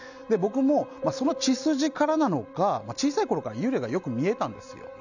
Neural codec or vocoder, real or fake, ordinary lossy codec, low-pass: none; real; none; 7.2 kHz